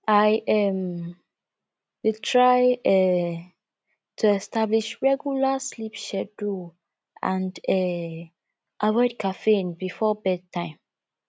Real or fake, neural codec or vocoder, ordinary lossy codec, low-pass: real; none; none; none